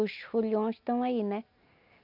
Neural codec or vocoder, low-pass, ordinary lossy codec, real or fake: none; 5.4 kHz; none; real